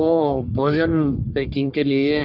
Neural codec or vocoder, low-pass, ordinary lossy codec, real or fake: codec, 44.1 kHz, 1.7 kbps, Pupu-Codec; 5.4 kHz; none; fake